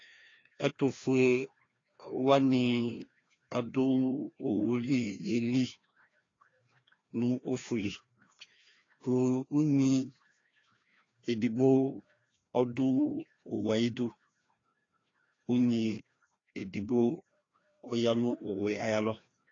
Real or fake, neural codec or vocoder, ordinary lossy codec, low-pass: fake; codec, 16 kHz, 1 kbps, FreqCodec, larger model; AAC, 32 kbps; 7.2 kHz